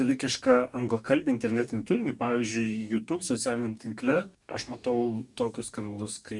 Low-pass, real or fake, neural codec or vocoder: 10.8 kHz; fake; codec, 44.1 kHz, 2.6 kbps, DAC